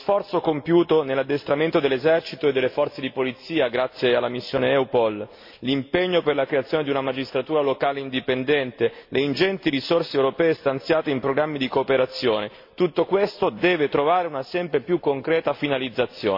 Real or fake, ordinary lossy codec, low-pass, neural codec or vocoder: real; MP3, 32 kbps; 5.4 kHz; none